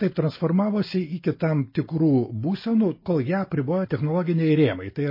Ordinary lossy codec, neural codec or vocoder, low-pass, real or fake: MP3, 24 kbps; none; 5.4 kHz; real